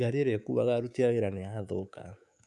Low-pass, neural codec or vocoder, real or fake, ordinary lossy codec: none; codec, 24 kHz, 3.1 kbps, DualCodec; fake; none